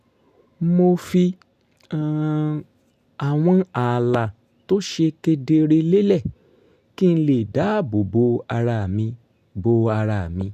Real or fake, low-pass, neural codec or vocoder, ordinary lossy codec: real; 14.4 kHz; none; none